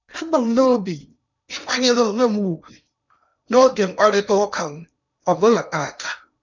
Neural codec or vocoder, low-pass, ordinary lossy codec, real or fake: codec, 16 kHz in and 24 kHz out, 0.8 kbps, FocalCodec, streaming, 65536 codes; 7.2 kHz; none; fake